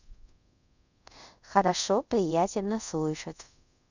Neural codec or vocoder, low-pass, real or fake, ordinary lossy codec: codec, 24 kHz, 0.5 kbps, DualCodec; 7.2 kHz; fake; none